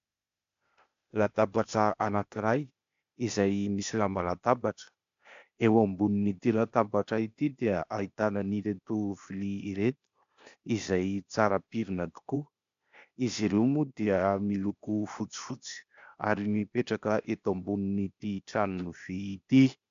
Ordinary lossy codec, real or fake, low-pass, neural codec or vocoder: MP3, 64 kbps; fake; 7.2 kHz; codec, 16 kHz, 0.8 kbps, ZipCodec